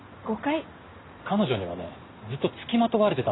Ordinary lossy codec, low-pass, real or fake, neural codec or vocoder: AAC, 16 kbps; 7.2 kHz; real; none